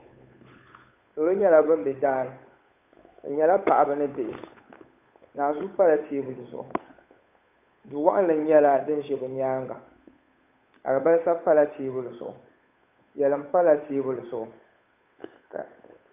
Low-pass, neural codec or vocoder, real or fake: 3.6 kHz; codec, 16 kHz, 8 kbps, FunCodec, trained on Chinese and English, 25 frames a second; fake